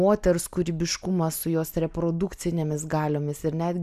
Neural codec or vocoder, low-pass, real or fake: none; 14.4 kHz; real